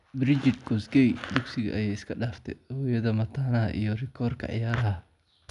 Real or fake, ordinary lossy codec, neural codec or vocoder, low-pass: real; none; none; 10.8 kHz